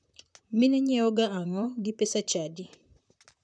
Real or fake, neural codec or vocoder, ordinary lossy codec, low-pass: fake; vocoder, 44.1 kHz, 128 mel bands, Pupu-Vocoder; none; 9.9 kHz